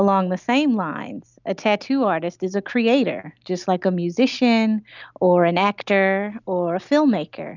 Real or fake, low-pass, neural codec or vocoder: real; 7.2 kHz; none